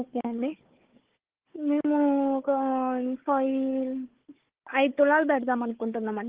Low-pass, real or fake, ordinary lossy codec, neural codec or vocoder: 3.6 kHz; fake; Opus, 16 kbps; codec, 16 kHz, 4 kbps, FunCodec, trained on Chinese and English, 50 frames a second